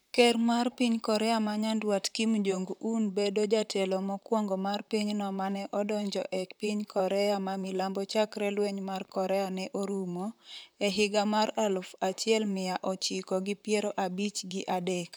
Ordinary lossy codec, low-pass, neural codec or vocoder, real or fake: none; none; vocoder, 44.1 kHz, 128 mel bands, Pupu-Vocoder; fake